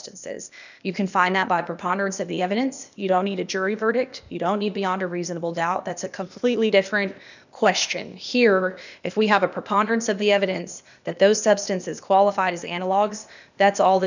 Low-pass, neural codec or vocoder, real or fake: 7.2 kHz; codec, 16 kHz, 0.8 kbps, ZipCodec; fake